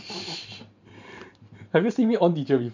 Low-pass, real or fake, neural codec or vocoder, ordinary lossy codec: 7.2 kHz; fake; vocoder, 44.1 kHz, 128 mel bands every 512 samples, BigVGAN v2; MP3, 64 kbps